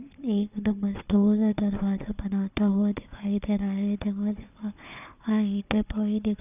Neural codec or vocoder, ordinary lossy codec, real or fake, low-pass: codec, 16 kHz, 4 kbps, FunCodec, trained on Chinese and English, 50 frames a second; none; fake; 3.6 kHz